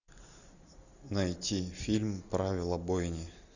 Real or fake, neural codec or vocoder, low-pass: real; none; 7.2 kHz